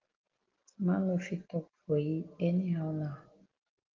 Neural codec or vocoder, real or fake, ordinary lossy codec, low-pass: none; real; Opus, 16 kbps; 7.2 kHz